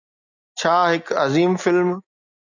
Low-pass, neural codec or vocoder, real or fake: 7.2 kHz; none; real